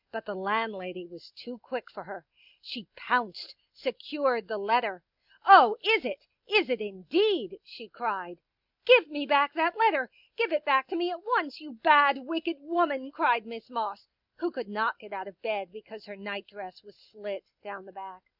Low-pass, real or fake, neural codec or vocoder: 5.4 kHz; real; none